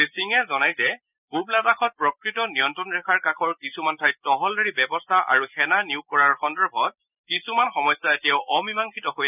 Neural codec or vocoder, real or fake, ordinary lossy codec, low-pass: none; real; none; 3.6 kHz